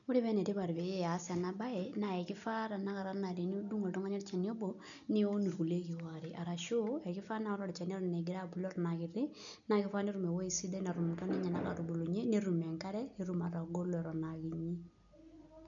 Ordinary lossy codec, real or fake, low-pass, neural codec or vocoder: none; real; 7.2 kHz; none